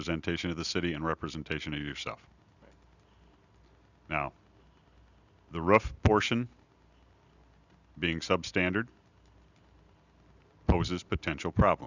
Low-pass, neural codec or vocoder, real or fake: 7.2 kHz; none; real